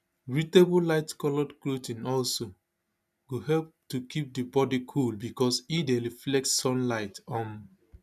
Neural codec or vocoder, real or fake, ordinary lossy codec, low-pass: none; real; none; 14.4 kHz